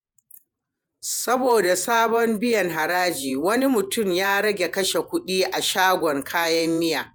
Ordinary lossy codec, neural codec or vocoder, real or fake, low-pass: none; vocoder, 48 kHz, 128 mel bands, Vocos; fake; none